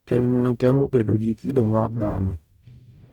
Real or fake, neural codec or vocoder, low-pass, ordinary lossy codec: fake; codec, 44.1 kHz, 0.9 kbps, DAC; 19.8 kHz; Opus, 64 kbps